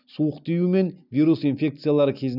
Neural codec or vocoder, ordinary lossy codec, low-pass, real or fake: none; none; 5.4 kHz; real